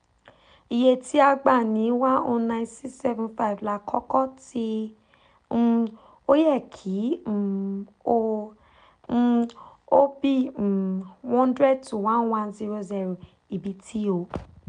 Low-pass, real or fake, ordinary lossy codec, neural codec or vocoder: 9.9 kHz; real; none; none